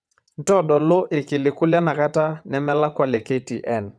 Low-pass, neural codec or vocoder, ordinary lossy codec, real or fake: none; vocoder, 22.05 kHz, 80 mel bands, WaveNeXt; none; fake